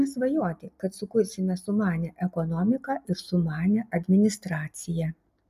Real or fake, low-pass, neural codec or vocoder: real; 14.4 kHz; none